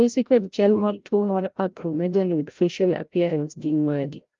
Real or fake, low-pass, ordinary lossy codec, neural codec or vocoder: fake; 7.2 kHz; Opus, 32 kbps; codec, 16 kHz, 0.5 kbps, FreqCodec, larger model